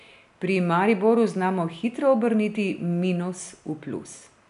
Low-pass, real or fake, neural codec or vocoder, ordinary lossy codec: 10.8 kHz; real; none; none